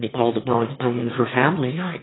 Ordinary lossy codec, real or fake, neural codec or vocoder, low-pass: AAC, 16 kbps; fake; autoencoder, 22.05 kHz, a latent of 192 numbers a frame, VITS, trained on one speaker; 7.2 kHz